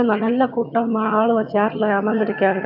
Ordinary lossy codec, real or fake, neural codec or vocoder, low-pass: none; fake; vocoder, 22.05 kHz, 80 mel bands, HiFi-GAN; 5.4 kHz